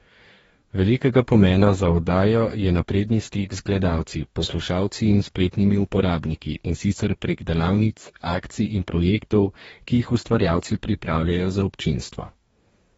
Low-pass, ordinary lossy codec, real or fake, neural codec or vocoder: 19.8 kHz; AAC, 24 kbps; fake; codec, 44.1 kHz, 2.6 kbps, DAC